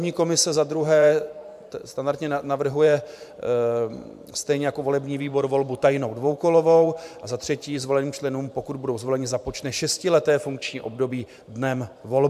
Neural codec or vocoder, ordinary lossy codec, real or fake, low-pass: vocoder, 44.1 kHz, 128 mel bands every 512 samples, BigVGAN v2; AAC, 96 kbps; fake; 14.4 kHz